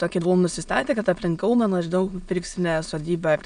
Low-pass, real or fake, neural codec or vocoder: 9.9 kHz; fake; autoencoder, 22.05 kHz, a latent of 192 numbers a frame, VITS, trained on many speakers